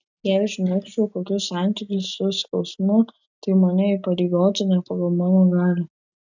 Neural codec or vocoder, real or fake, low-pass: none; real; 7.2 kHz